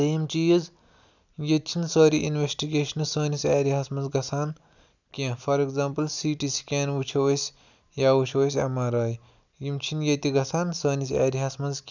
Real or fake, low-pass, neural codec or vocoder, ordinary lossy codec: real; 7.2 kHz; none; none